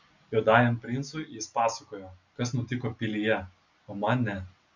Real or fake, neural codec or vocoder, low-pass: real; none; 7.2 kHz